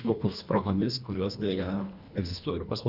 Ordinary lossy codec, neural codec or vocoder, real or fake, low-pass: Opus, 64 kbps; codec, 24 kHz, 1.5 kbps, HILCodec; fake; 5.4 kHz